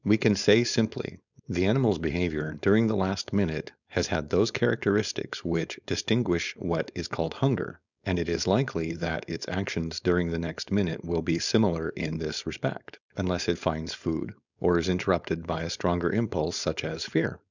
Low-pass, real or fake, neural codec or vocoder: 7.2 kHz; fake; codec, 16 kHz, 4.8 kbps, FACodec